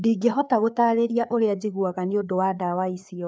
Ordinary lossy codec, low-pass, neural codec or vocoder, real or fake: none; none; codec, 16 kHz, 4 kbps, FreqCodec, larger model; fake